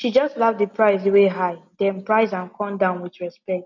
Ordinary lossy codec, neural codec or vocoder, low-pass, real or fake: none; none; 7.2 kHz; real